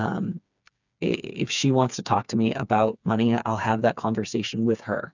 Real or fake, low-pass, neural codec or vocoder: fake; 7.2 kHz; codec, 16 kHz, 4 kbps, FreqCodec, smaller model